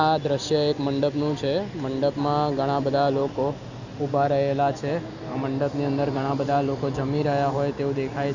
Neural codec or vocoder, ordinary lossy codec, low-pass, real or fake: none; none; 7.2 kHz; real